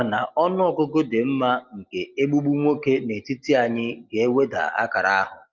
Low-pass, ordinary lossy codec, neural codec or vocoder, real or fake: 7.2 kHz; Opus, 32 kbps; none; real